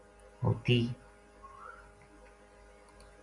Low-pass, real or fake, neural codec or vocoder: 10.8 kHz; real; none